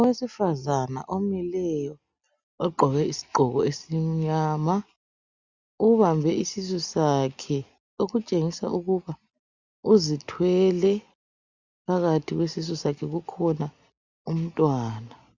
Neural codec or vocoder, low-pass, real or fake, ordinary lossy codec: none; 7.2 kHz; real; Opus, 64 kbps